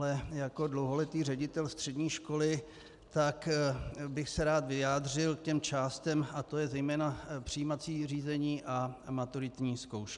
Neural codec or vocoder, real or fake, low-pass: none; real; 10.8 kHz